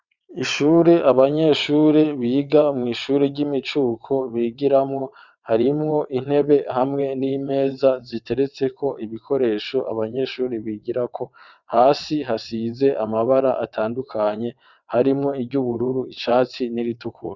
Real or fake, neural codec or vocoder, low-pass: fake; vocoder, 22.05 kHz, 80 mel bands, WaveNeXt; 7.2 kHz